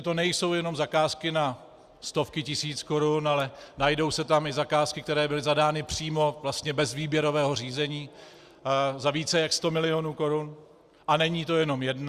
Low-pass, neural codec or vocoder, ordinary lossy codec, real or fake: 14.4 kHz; none; Opus, 64 kbps; real